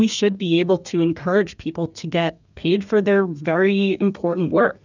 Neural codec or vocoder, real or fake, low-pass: codec, 32 kHz, 1.9 kbps, SNAC; fake; 7.2 kHz